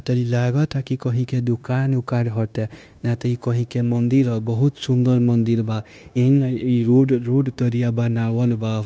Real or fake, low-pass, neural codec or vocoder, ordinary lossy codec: fake; none; codec, 16 kHz, 0.9 kbps, LongCat-Audio-Codec; none